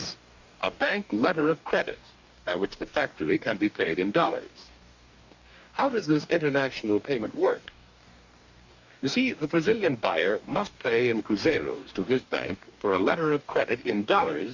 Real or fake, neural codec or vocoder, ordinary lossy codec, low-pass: fake; codec, 44.1 kHz, 2.6 kbps, DAC; Opus, 64 kbps; 7.2 kHz